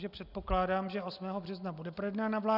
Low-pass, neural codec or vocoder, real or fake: 5.4 kHz; none; real